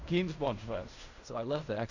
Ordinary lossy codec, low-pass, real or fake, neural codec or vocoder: none; 7.2 kHz; fake; codec, 16 kHz in and 24 kHz out, 0.4 kbps, LongCat-Audio-Codec, fine tuned four codebook decoder